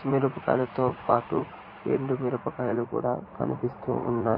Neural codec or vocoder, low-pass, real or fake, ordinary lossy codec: vocoder, 44.1 kHz, 80 mel bands, Vocos; 5.4 kHz; fake; MP3, 24 kbps